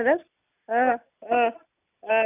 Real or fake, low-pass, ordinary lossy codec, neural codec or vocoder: real; 3.6 kHz; none; none